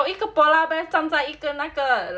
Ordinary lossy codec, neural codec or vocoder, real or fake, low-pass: none; none; real; none